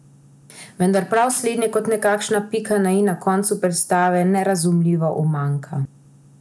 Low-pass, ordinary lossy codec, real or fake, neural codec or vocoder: none; none; real; none